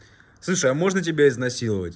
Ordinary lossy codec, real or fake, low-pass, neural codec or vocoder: none; real; none; none